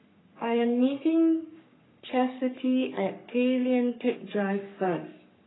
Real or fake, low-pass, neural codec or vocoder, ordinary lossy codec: fake; 7.2 kHz; codec, 44.1 kHz, 3.4 kbps, Pupu-Codec; AAC, 16 kbps